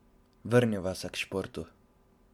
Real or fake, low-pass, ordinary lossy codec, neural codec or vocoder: real; 19.8 kHz; MP3, 96 kbps; none